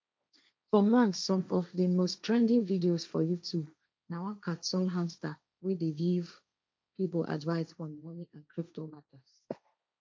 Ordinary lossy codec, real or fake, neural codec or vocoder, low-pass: none; fake; codec, 16 kHz, 1.1 kbps, Voila-Tokenizer; none